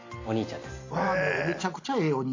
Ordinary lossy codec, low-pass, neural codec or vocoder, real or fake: AAC, 32 kbps; 7.2 kHz; none; real